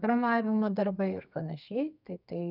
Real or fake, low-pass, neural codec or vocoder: fake; 5.4 kHz; codec, 32 kHz, 1.9 kbps, SNAC